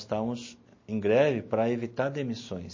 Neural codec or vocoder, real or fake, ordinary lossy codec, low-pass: none; real; MP3, 32 kbps; 7.2 kHz